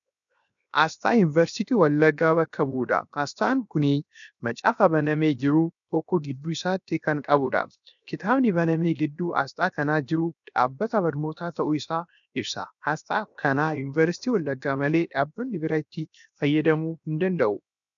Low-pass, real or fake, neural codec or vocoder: 7.2 kHz; fake; codec, 16 kHz, 0.7 kbps, FocalCodec